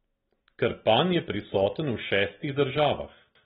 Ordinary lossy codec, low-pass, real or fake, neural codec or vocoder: AAC, 16 kbps; 7.2 kHz; real; none